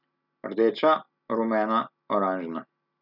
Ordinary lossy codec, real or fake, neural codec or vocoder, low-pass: none; real; none; 5.4 kHz